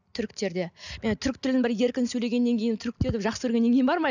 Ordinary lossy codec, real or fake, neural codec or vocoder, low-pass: none; real; none; 7.2 kHz